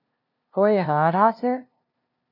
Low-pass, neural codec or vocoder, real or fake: 5.4 kHz; codec, 16 kHz, 0.5 kbps, FunCodec, trained on LibriTTS, 25 frames a second; fake